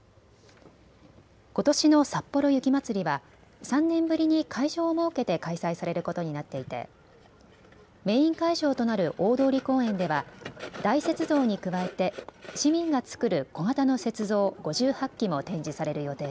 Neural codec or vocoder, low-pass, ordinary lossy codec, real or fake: none; none; none; real